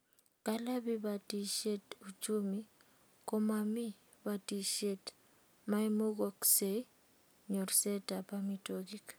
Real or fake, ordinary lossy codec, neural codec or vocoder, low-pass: real; none; none; none